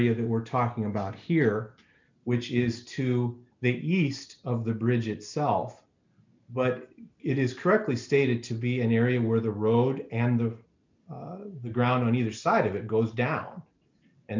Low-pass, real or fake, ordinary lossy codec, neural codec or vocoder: 7.2 kHz; real; MP3, 64 kbps; none